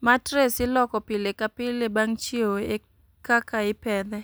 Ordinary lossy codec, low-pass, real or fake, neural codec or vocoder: none; none; real; none